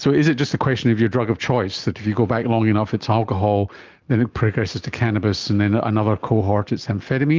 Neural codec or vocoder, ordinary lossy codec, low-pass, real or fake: none; Opus, 24 kbps; 7.2 kHz; real